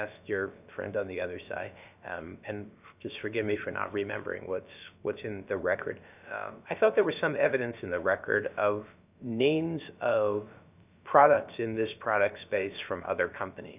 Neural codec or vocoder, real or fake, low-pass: codec, 16 kHz, about 1 kbps, DyCAST, with the encoder's durations; fake; 3.6 kHz